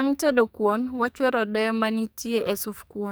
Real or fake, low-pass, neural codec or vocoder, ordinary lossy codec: fake; none; codec, 44.1 kHz, 2.6 kbps, SNAC; none